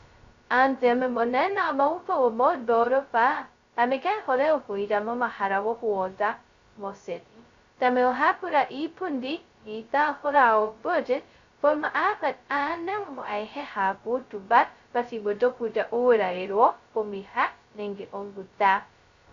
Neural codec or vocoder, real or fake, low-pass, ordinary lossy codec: codec, 16 kHz, 0.2 kbps, FocalCodec; fake; 7.2 kHz; Opus, 64 kbps